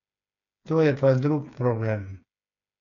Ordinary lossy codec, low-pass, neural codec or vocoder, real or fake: none; 7.2 kHz; codec, 16 kHz, 4 kbps, FreqCodec, smaller model; fake